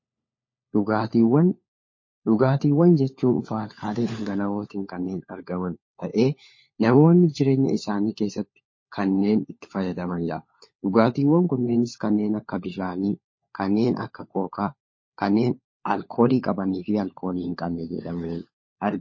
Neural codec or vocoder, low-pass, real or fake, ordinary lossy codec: codec, 16 kHz, 4 kbps, FunCodec, trained on LibriTTS, 50 frames a second; 7.2 kHz; fake; MP3, 32 kbps